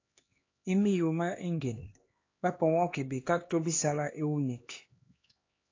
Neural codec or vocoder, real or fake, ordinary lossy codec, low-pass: codec, 16 kHz, 2 kbps, X-Codec, HuBERT features, trained on LibriSpeech; fake; AAC, 32 kbps; 7.2 kHz